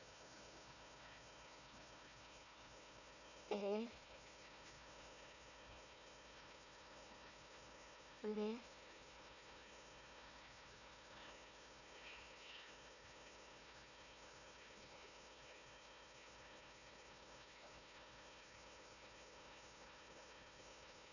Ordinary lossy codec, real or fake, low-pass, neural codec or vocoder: none; fake; 7.2 kHz; codec, 16 kHz, 1 kbps, FunCodec, trained on Chinese and English, 50 frames a second